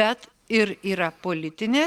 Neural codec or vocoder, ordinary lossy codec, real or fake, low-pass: none; Opus, 64 kbps; real; 19.8 kHz